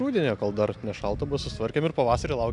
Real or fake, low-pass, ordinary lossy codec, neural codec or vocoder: real; 10.8 kHz; MP3, 96 kbps; none